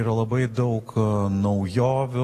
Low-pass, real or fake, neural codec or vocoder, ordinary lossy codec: 14.4 kHz; real; none; AAC, 48 kbps